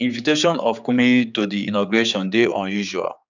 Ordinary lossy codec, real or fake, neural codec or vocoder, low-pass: none; fake; codec, 16 kHz, 4 kbps, X-Codec, HuBERT features, trained on general audio; 7.2 kHz